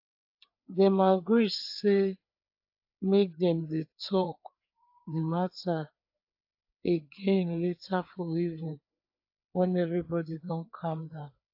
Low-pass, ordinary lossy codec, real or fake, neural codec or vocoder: 5.4 kHz; AAC, 48 kbps; fake; codec, 16 kHz, 4 kbps, FreqCodec, larger model